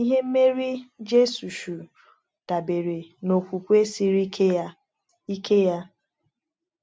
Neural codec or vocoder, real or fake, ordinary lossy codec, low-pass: none; real; none; none